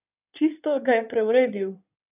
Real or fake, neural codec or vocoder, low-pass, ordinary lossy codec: fake; codec, 16 kHz in and 24 kHz out, 2.2 kbps, FireRedTTS-2 codec; 3.6 kHz; none